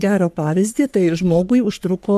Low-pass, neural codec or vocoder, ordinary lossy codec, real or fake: 14.4 kHz; codec, 44.1 kHz, 3.4 kbps, Pupu-Codec; MP3, 96 kbps; fake